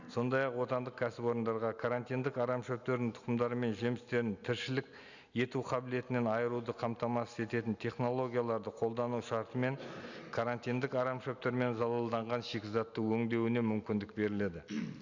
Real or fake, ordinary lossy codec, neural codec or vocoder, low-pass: real; none; none; 7.2 kHz